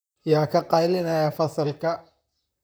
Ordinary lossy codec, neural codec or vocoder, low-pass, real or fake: none; vocoder, 44.1 kHz, 128 mel bands, Pupu-Vocoder; none; fake